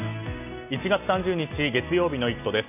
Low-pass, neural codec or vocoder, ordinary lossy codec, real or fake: 3.6 kHz; none; none; real